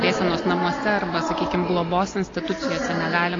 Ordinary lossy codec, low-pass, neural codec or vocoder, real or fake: AAC, 32 kbps; 7.2 kHz; none; real